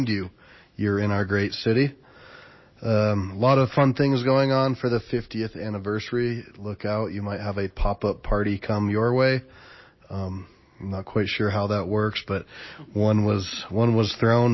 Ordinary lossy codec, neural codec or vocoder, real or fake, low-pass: MP3, 24 kbps; none; real; 7.2 kHz